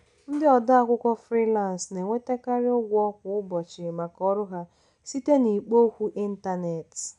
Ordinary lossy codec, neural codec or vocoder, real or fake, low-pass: none; none; real; 10.8 kHz